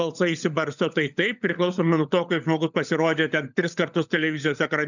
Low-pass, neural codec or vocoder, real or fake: 7.2 kHz; codec, 16 kHz, 16 kbps, FunCodec, trained on LibriTTS, 50 frames a second; fake